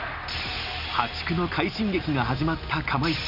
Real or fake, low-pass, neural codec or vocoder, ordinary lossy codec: real; 5.4 kHz; none; none